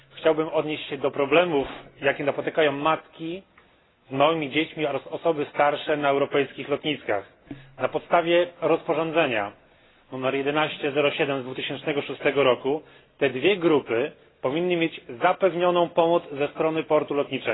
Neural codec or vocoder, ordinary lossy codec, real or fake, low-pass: none; AAC, 16 kbps; real; 7.2 kHz